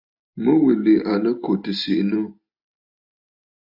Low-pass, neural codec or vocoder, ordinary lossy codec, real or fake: 5.4 kHz; none; AAC, 48 kbps; real